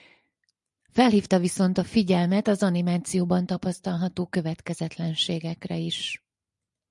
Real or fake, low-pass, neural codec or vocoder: real; 10.8 kHz; none